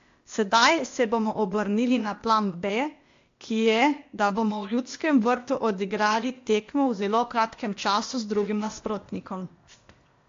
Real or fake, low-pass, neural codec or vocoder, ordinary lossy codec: fake; 7.2 kHz; codec, 16 kHz, 0.8 kbps, ZipCodec; AAC, 48 kbps